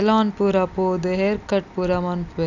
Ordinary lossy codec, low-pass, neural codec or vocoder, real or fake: none; 7.2 kHz; none; real